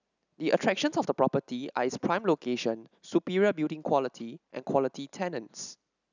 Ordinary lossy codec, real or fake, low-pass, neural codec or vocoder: none; real; 7.2 kHz; none